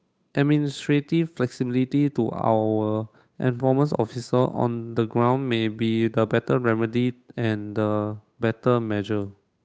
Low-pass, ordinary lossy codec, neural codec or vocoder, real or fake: none; none; codec, 16 kHz, 8 kbps, FunCodec, trained on Chinese and English, 25 frames a second; fake